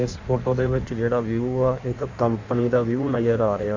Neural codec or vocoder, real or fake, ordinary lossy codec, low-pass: codec, 16 kHz in and 24 kHz out, 1.1 kbps, FireRedTTS-2 codec; fake; Opus, 64 kbps; 7.2 kHz